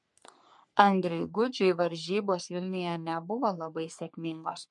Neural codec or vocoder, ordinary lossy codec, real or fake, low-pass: codec, 44.1 kHz, 3.4 kbps, Pupu-Codec; MP3, 64 kbps; fake; 10.8 kHz